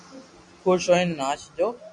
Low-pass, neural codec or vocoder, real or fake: 10.8 kHz; none; real